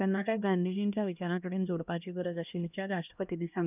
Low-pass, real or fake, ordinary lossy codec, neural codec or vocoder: 3.6 kHz; fake; none; codec, 16 kHz, 1 kbps, X-Codec, HuBERT features, trained on LibriSpeech